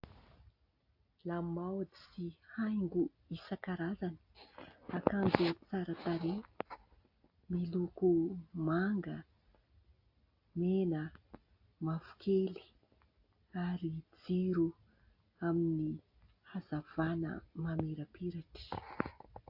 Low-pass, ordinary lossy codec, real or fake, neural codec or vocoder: 5.4 kHz; AAC, 48 kbps; real; none